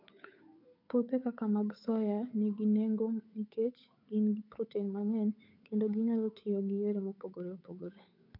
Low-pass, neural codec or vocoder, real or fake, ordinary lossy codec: 5.4 kHz; codec, 16 kHz, 8 kbps, FunCodec, trained on Chinese and English, 25 frames a second; fake; AAC, 48 kbps